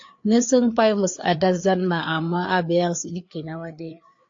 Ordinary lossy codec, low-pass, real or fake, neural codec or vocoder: AAC, 48 kbps; 7.2 kHz; fake; codec, 16 kHz, 4 kbps, FreqCodec, larger model